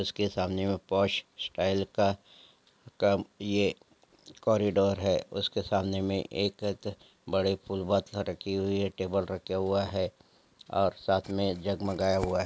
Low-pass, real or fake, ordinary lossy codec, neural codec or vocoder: none; real; none; none